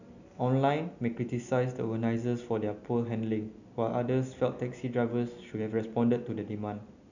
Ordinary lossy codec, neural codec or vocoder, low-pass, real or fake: none; none; 7.2 kHz; real